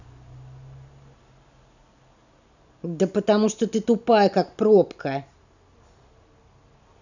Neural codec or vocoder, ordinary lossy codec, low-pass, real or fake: none; none; 7.2 kHz; real